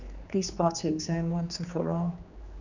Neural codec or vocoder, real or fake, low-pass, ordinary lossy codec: codec, 16 kHz, 2 kbps, X-Codec, HuBERT features, trained on general audio; fake; 7.2 kHz; none